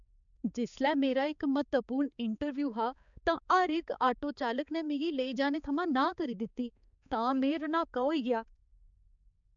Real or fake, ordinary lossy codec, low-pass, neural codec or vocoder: fake; none; 7.2 kHz; codec, 16 kHz, 4 kbps, X-Codec, HuBERT features, trained on balanced general audio